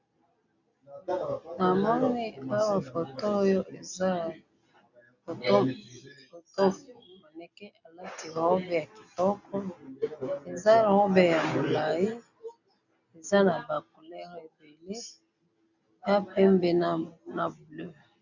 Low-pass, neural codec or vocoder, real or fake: 7.2 kHz; none; real